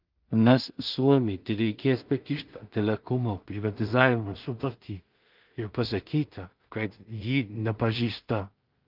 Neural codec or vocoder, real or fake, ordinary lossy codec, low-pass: codec, 16 kHz in and 24 kHz out, 0.4 kbps, LongCat-Audio-Codec, two codebook decoder; fake; Opus, 24 kbps; 5.4 kHz